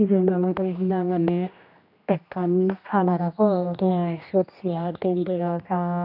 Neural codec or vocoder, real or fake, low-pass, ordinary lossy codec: codec, 16 kHz, 1 kbps, X-Codec, HuBERT features, trained on general audio; fake; 5.4 kHz; none